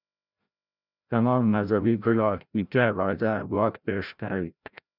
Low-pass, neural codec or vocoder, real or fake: 5.4 kHz; codec, 16 kHz, 0.5 kbps, FreqCodec, larger model; fake